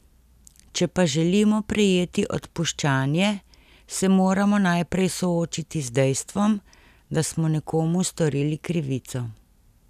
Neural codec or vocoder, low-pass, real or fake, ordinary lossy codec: none; 14.4 kHz; real; none